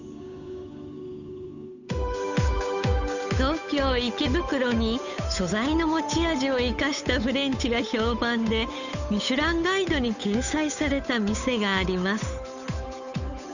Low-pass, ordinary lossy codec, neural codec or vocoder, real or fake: 7.2 kHz; none; codec, 16 kHz, 8 kbps, FunCodec, trained on Chinese and English, 25 frames a second; fake